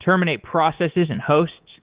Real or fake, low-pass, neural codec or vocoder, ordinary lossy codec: fake; 3.6 kHz; codec, 24 kHz, 3.1 kbps, DualCodec; Opus, 16 kbps